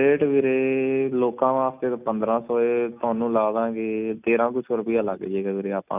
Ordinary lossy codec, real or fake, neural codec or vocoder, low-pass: none; real; none; 3.6 kHz